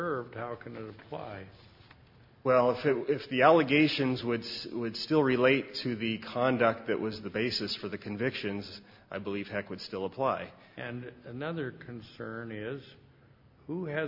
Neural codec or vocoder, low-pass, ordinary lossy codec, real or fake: none; 5.4 kHz; MP3, 32 kbps; real